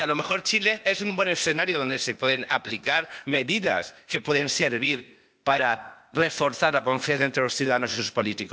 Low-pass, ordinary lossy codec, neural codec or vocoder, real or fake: none; none; codec, 16 kHz, 0.8 kbps, ZipCodec; fake